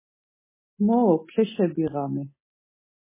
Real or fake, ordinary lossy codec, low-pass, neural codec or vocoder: real; MP3, 16 kbps; 3.6 kHz; none